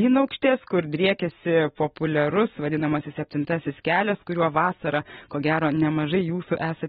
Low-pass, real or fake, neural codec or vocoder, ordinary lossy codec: 19.8 kHz; real; none; AAC, 16 kbps